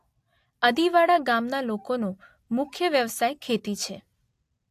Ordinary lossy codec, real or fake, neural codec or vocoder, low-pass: AAC, 64 kbps; real; none; 14.4 kHz